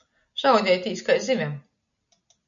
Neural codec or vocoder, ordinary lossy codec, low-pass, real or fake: none; MP3, 96 kbps; 7.2 kHz; real